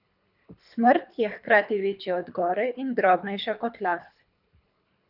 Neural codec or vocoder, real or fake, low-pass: codec, 24 kHz, 3 kbps, HILCodec; fake; 5.4 kHz